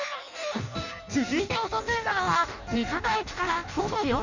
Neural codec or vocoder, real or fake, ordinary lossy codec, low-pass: codec, 16 kHz in and 24 kHz out, 0.6 kbps, FireRedTTS-2 codec; fake; none; 7.2 kHz